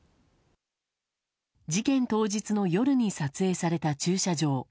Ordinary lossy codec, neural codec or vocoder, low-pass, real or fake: none; none; none; real